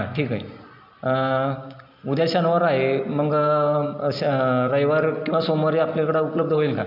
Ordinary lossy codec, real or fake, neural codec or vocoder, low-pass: AAC, 48 kbps; real; none; 5.4 kHz